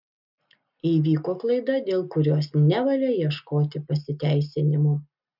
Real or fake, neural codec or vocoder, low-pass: real; none; 5.4 kHz